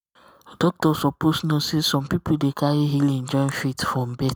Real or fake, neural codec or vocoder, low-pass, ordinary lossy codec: real; none; none; none